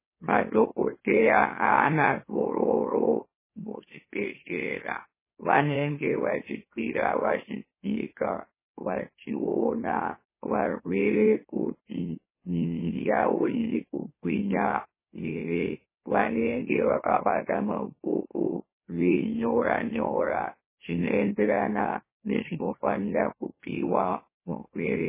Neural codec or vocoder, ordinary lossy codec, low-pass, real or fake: autoencoder, 44.1 kHz, a latent of 192 numbers a frame, MeloTTS; MP3, 16 kbps; 3.6 kHz; fake